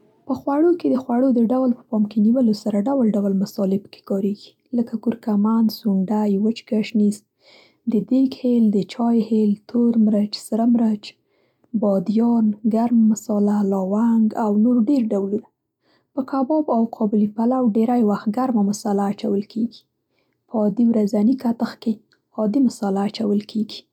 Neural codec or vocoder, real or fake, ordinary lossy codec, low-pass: none; real; none; 19.8 kHz